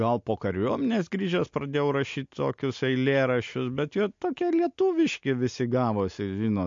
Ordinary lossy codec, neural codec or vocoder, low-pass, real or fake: MP3, 48 kbps; none; 7.2 kHz; real